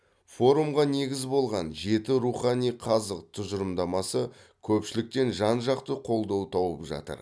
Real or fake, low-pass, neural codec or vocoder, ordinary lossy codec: real; none; none; none